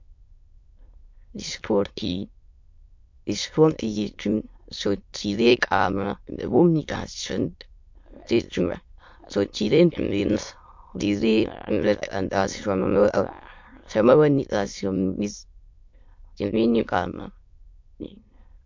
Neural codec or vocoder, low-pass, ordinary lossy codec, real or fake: autoencoder, 22.05 kHz, a latent of 192 numbers a frame, VITS, trained on many speakers; 7.2 kHz; MP3, 48 kbps; fake